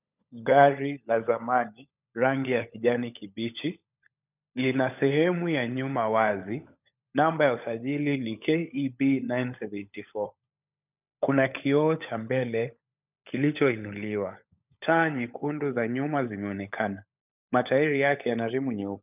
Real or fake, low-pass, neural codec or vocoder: fake; 3.6 kHz; codec, 16 kHz, 16 kbps, FunCodec, trained on LibriTTS, 50 frames a second